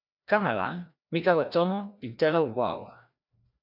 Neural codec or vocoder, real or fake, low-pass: codec, 16 kHz, 1 kbps, FreqCodec, larger model; fake; 5.4 kHz